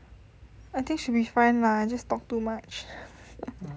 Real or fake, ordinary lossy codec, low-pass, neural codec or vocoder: real; none; none; none